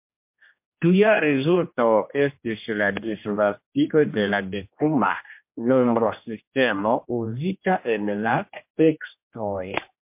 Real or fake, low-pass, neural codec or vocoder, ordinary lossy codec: fake; 3.6 kHz; codec, 16 kHz, 1 kbps, X-Codec, HuBERT features, trained on general audio; MP3, 32 kbps